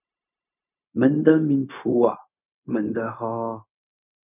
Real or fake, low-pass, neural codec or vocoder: fake; 3.6 kHz; codec, 16 kHz, 0.4 kbps, LongCat-Audio-Codec